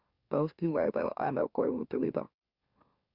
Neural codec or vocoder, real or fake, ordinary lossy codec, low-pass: autoencoder, 44.1 kHz, a latent of 192 numbers a frame, MeloTTS; fake; Opus, 64 kbps; 5.4 kHz